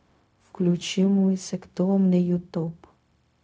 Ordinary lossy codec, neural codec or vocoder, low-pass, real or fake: none; codec, 16 kHz, 0.4 kbps, LongCat-Audio-Codec; none; fake